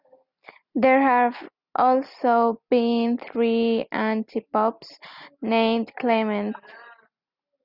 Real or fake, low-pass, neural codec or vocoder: real; 5.4 kHz; none